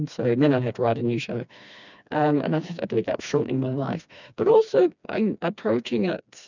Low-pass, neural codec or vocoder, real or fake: 7.2 kHz; codec, 16 kHz, 2 kbps, FreqCodec, smaller model; fake